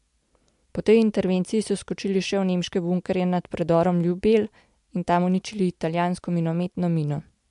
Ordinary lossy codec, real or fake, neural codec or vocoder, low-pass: MP3, 64 kbps; real; none; 10.8 kHz